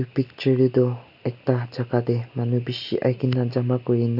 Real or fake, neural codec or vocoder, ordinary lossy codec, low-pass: real; none; none; 5.4 kHz